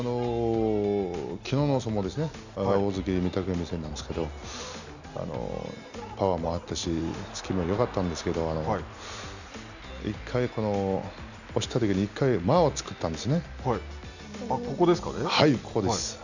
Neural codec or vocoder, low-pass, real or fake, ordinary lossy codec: none; 7.2 kHz; real; none